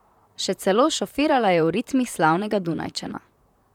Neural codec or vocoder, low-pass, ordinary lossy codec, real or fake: vocoder, 44.1 kHz, 128 mel bands, Pupu-Vocoder; 19.8 kHz; none; fake